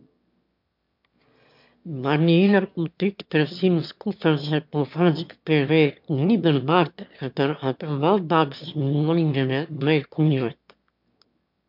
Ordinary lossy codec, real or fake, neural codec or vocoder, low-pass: MP3, 48 kbps; fake; autoencoder, 22.05 kHz, a latent of 192 numbers a frame, VITS, trained on one speaker; 5.4 kHz